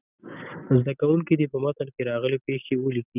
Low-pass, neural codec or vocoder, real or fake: 3.6 kHz; none; real